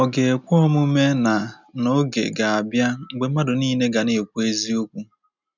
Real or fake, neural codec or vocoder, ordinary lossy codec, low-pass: real; none; none; 7.2 kHz